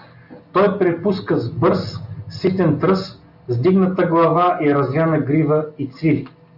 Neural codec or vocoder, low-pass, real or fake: none; 5.4 kHz; real